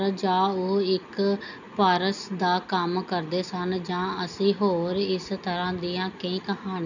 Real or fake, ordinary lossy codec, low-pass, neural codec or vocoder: real; none; 7.2 kHz; none